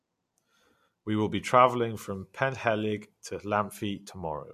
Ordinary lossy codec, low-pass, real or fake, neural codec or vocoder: MP3, 64 kbps; 14.4 kHz; real; none